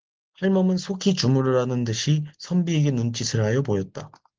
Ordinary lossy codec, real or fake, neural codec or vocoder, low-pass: Opus, 16 kbps; real; none; 7.2 kHz